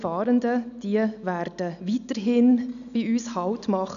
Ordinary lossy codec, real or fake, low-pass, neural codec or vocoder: none; real; 7.2 kHz; none